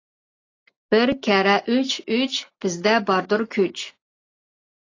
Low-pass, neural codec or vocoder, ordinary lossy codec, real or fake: 7.2 kHz; none; AAC, 32 kbps; real